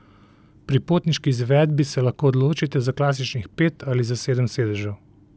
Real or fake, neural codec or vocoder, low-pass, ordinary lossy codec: real; none; none; none